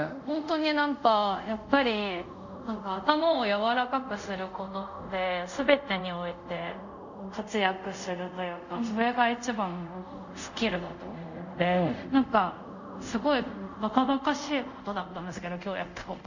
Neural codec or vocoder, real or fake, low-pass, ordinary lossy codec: codec, 24 kHz, 0.5 kbps, DualCodec; fake; 7.2 kHz; none